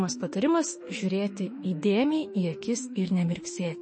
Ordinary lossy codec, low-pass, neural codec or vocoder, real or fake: MP3, 32 kbps; 9.9 kHz; autoencoder, 48 kHz, 32 numbers a frame, DAC-VAE, trained on Japanese speech; fake